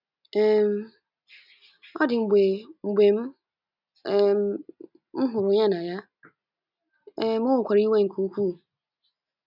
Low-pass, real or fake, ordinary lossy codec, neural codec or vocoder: 5.4 kHz; real; none; none